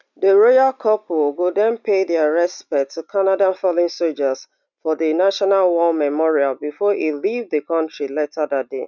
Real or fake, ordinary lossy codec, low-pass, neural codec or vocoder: real; none; 7.2 kHz; none